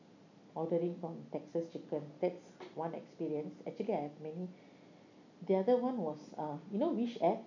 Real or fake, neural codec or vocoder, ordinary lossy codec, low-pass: real; none; none; 7.2 kHz